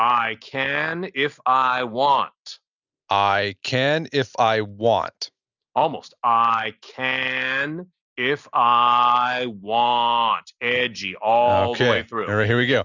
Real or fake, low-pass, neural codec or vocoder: real; 7.2 kHz; none